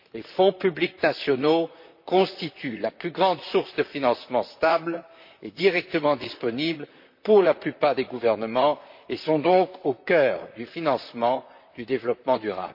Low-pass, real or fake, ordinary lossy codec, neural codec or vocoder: 5.4 kHz; fake; MP3, 32 kbps; vocoder, 44.1 kHz, 128 mel bands, Pupu-Vocoder